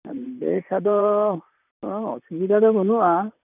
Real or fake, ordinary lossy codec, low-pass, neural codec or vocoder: fake; none; 3.6 kHz; vocoder, 44.1 kHz, 128 mel bands, Pupu-Vocoder